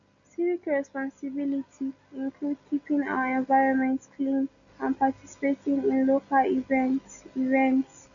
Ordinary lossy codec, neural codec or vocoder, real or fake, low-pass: none; none; real; 7.2 kHz